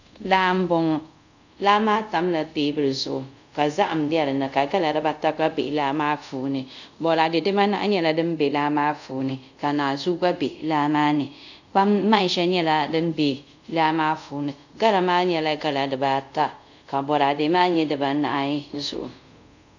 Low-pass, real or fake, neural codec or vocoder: 7.2 kHz; fake; codec, 24 kHz, 0.5 kbps, DualCodec